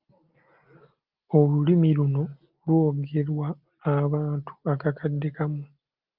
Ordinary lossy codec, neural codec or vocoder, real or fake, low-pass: Opus, 24 kbps; none; real; 5.4 kHz